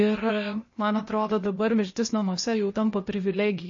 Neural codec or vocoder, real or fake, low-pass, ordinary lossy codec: codec, 16 kHz, 0.8 kbps, ZipCodec; fake; 7.2 kHz; MP3, 32 kbps